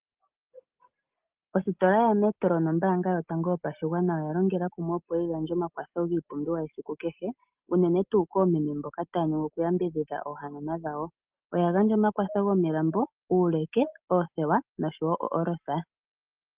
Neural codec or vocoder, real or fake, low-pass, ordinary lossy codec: none; real; 3.6 kHz; Opus, 32 kbps